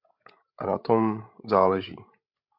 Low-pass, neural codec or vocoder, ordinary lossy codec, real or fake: 5.4 kHz; codec, 16 kHz, 16 kbps, FreqCodec, larger model; MP3, 48 kbps; fake